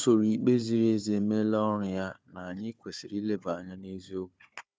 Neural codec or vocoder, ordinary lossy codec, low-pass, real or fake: codec, 16 kHz, 4 kbps, FunCodec, trained on Chinese and English, 50 frames a second; none; none; fake